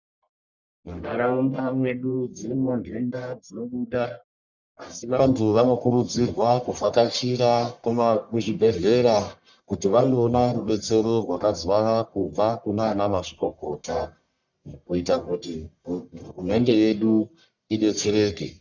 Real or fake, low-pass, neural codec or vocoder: fake; 7.2 kHz; codec, 44.1 kHz, 1.7 kbps, Pupu-Codec